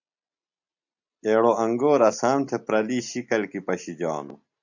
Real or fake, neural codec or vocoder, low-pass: real; none; 7.2 kHz